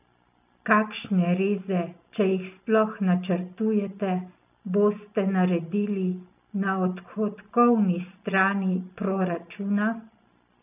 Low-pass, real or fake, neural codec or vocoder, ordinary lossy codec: 3.6 kHz; real; none; none